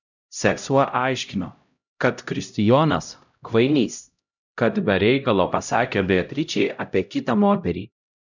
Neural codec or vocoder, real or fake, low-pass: codec, 16 kHz, 0.5 kbps, X-Codec, HuBERT features, trained on LibriSpeech; fake; 7.2 kHz